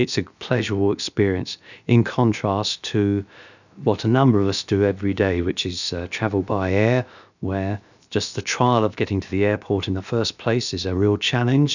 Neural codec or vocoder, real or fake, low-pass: codec, 16 kHz, about 1 kbps, DyCAST, with the encoder's durations; fake; 7.2 kHz